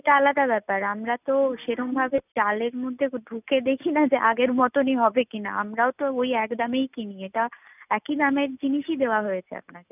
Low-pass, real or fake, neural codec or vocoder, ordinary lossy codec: 3.6 kHz; real; none; none